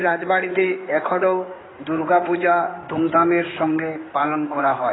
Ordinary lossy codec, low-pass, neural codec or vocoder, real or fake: AAC, 16 kbps; 7.2 kHz; codec, 16 kHz in and 24 kHz out, 2.2 kbps, FireRedTTS-2 codec; fake